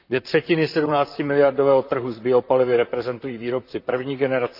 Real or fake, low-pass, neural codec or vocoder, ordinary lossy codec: fake; 5.4 kHz; vocoder, 44.1 kHz, 128 mel bands, Pupu-Vocoder; none